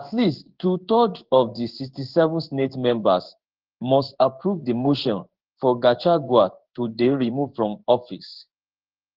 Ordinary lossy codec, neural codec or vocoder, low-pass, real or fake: Opus, 16 kbps; codec, 16 kHz in and 24 kHz out, 1 kbps, XY-Tokenizer; 5.4 kHz; fake